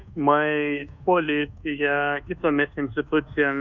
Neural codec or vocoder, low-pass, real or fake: codec, 24 kHz, 1.2 kbps, DualCodec; 7.2 kHz; fake